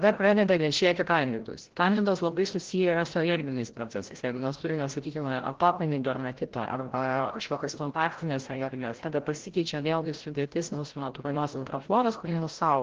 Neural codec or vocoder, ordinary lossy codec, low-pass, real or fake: codec, 16 kHz, 0.5 kbps, FreqCodec, larger model; Opus, 16 kbps; 7.2 kHz; fake